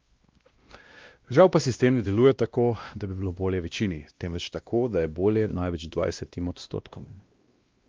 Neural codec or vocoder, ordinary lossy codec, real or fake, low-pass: codec, 16 kHz, 1 kbps, X-Codec, WavLM features, trained on Multilingual LibriSpeech; Opus, 24 kbps; fake; 7.2 kHz